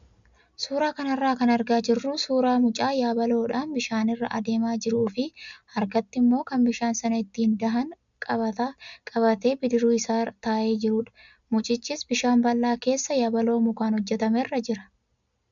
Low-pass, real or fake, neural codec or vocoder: 7.2 kHz; real; none